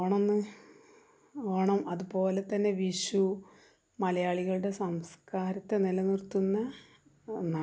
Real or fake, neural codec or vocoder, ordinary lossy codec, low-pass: real; none; none; none